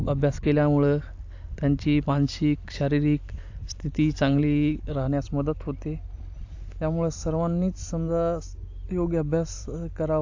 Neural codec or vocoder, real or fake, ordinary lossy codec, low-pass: vocoder, 44.1 kHz, 128 mel bands every 256 samples, BigVGAN v2; fake; AAC, 48 kbps; 7.2 kHz